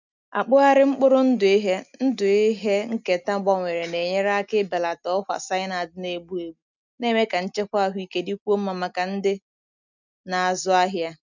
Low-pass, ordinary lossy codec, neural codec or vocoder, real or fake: 7.2 kHz; none; none; real